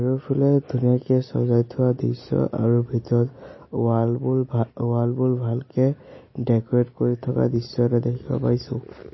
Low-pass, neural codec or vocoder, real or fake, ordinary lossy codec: 7.2 kHz; none; real; MP3, 24 kbps